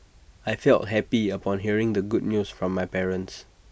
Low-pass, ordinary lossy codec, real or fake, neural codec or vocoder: none; none; real; none